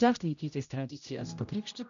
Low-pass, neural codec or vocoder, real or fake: 7.2 kHz; codec, 16 kHz, 0.5 kbps, X-Codec, HuBERT features, trained on general audio; fake